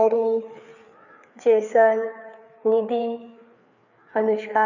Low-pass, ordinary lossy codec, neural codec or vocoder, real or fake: 7.2 kHz; none; codec, 16 kHz, 4 kbps, FreqCodec, larger model; fake